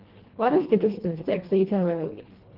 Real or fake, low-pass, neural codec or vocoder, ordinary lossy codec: fake; 5.4 kHz; codec, 24 kHz, 1.5 kbps, HILCodec; Opus, 16 kbps